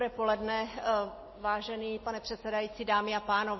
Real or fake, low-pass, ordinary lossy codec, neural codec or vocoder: real; 7.2 kHz; MP3, 24 kbps; none